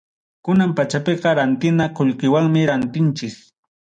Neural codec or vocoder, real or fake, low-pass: none; real; 9.9 kHz